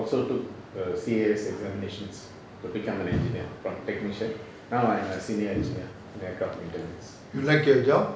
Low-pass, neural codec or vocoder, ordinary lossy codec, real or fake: none; none; none; real